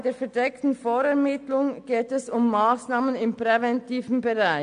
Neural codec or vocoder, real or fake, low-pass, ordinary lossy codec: none; real; 9.9 kHz; AAC, 64 kbps